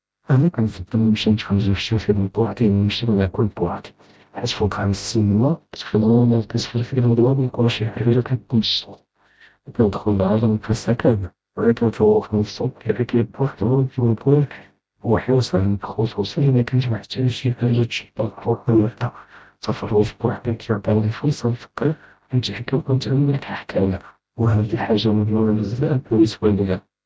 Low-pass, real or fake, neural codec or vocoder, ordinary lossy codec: none; fake; codec, 16 kHz, 0.5 kbps, FreqCodec, smaller model; none